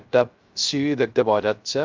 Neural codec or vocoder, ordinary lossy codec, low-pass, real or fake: codec, 16 kHz, 0.3 kbps, FocalCodec; Opus, 24 kbps; 7.2 kHz; fake